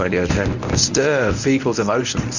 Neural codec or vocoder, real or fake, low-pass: codec, 24 kHz, 0.9 kbps, WavTokenizer, medium speech release version 1; fake; 7.2 kHz